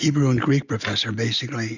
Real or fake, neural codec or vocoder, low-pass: real; none; 7.2 kHz